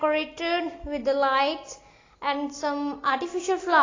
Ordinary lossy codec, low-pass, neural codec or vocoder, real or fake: AAC, 32 kbps; 7.2 kHz; none; real